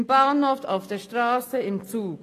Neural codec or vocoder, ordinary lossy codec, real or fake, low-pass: none; AAC, 48 kbps; real; 14.4 kHz